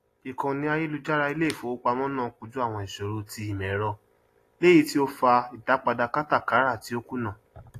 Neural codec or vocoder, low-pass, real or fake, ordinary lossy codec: none; 14.4 kHz; real; AAC, 48 kbps